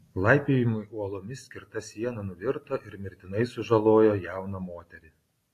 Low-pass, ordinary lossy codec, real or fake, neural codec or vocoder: 14.4 kHz; AAC, 48 kbps; real; none